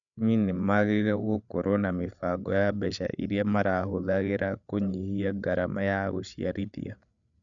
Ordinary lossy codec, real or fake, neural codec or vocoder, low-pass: none; fake; codec, 16 kHz, 8 kbps, FreqCodec, larger model; 7.2 kHz